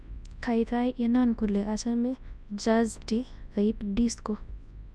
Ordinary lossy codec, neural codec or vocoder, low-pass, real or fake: none; codec, 24 kHz, 0.9 kbps, WavTokenizer, large speech release; none; fake